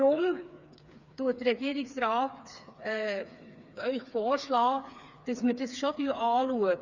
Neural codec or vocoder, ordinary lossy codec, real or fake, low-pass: codec, 16 kHz, 4 kbps, FreqCodec, smaller model; none; fake; 7.2 kHz